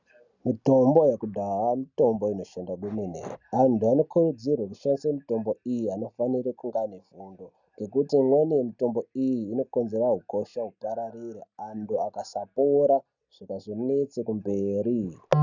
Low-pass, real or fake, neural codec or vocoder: 7.2 kHz; real; none